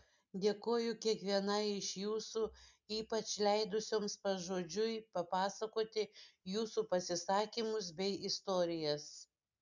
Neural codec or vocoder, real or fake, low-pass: none; real; 7.2 kHz